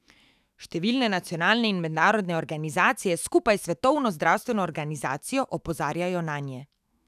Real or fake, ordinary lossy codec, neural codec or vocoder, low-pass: fake; none; autoencoder, 48 kHz, 128 numbers a frame, DAC-VAE, trained on Japanese speech; 14.4 kHz